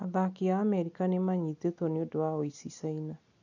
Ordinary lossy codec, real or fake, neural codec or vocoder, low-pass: none; real; none; 7.2 kHz